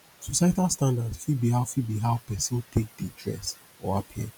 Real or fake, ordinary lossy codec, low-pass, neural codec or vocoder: real; none; none; none